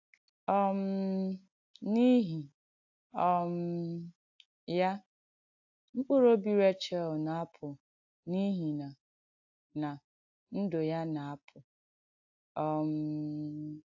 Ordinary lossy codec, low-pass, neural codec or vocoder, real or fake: AAC, 48 kbps; 7.2 kHz; none; real